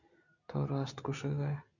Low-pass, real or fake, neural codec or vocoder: 7.2 kHz; real; none